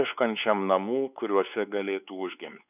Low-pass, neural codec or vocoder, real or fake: 3.6 kHz; codec, 16 kHz, 4 kbps, X-Codec, WavLM features, trained on Multilingual LibriSpeech; fake